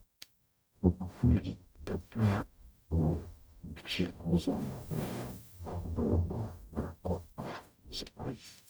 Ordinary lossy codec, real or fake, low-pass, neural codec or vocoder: none; fake; none; codec, 44.1 kHz, 0.9 kbps, DAC